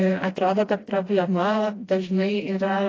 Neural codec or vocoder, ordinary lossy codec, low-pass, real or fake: codec, 16 kHz, 1 kbps, FreqCodec, smaller model; AAC, 32 kbps; 7.2 kHz; fake